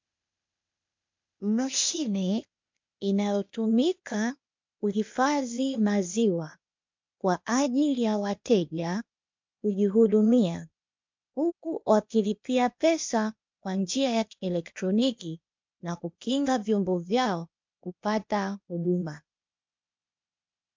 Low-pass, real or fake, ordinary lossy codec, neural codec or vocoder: 7.2 kHz; fake; MP3, 64 kbps; codec, 16 kHz, 0.8 kbps, ZipCodec